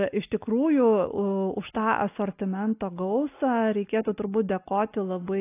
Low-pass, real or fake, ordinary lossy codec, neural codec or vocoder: 3.6 kHz; real; AAC, 24 kbps; none